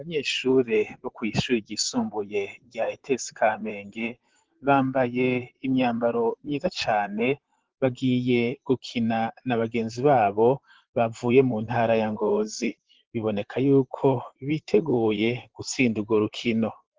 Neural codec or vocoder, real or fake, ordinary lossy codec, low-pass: vocoder, 44.1 kHz, 128 mel bands, Pupu-Vocoder; fake; Opus, 16 kbps; 7.2 kHz